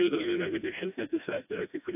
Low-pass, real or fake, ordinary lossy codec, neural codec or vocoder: 3.6 kHz; fake; AAC, 32 kbps; codec, 16 kHz, 1 kbps, FreqCodec, smaller model